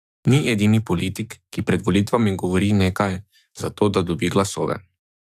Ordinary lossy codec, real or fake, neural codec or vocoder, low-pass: none; fake; codec, 44.1 kHz, 7.8 kbps, DAC; 14.4 kHz